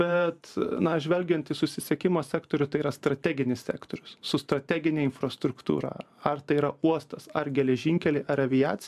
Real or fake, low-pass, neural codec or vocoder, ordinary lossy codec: fake; 14.4 kHz; vocoder, 44.1 kHz, 128 mel bands every 512 samples, BigVGAN v2; MP3, 96 kbps